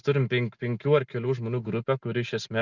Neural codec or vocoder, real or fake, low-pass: none; real; 7.2 kHz